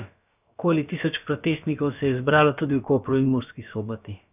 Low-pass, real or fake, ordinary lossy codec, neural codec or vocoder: 3.6 kHz; fake; none; codec, 16 kHz, about 1 kbps, DyCAST, with the encoder's durations